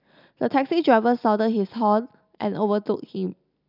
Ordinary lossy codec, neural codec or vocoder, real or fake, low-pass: none; none; real; 5.4 kHz